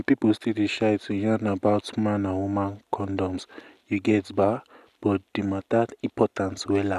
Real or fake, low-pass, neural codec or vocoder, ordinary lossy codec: real; 14.4 kHz; none; none